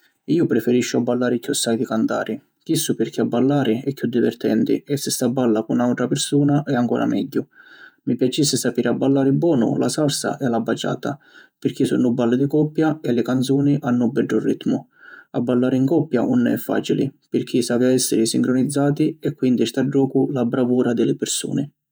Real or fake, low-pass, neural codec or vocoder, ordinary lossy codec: fake; none; vocoder, 48 kHz, 128 mel bands, Vocos; none